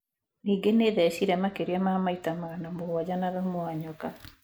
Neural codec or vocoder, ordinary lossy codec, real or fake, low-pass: none; none; real; none